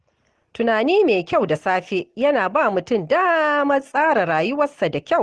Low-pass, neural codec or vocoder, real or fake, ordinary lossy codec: 10.8 kHz; none; real; Opus, 16 kbps